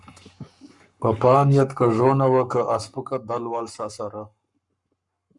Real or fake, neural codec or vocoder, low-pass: fake; codec, 44.1 kHz, 7.8 kbps, Pupu-Codec; 10.8 kHz